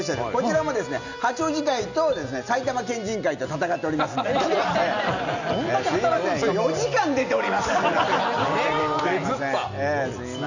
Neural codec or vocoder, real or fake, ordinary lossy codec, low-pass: none; real; none; 7.2 kHz